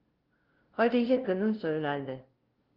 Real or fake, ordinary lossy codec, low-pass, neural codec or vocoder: fake; Opus, 16 kbps; 5.4 kHz; codec, 16 kHz, 0.5 kbps, FunCodec, trained on LibriTTS, 25 frames a second